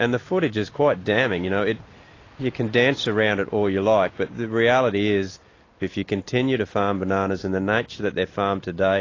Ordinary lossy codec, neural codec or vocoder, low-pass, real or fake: AAC, 32 kbps; none; 7.2 kHz; real